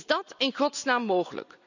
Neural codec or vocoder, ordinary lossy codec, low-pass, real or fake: none; none; 7.2 kHz; real